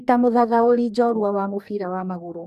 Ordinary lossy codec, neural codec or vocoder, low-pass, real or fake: none; codec, 44.1 kHz, 2.6 kbps, DAC; 14.4 kHz; fake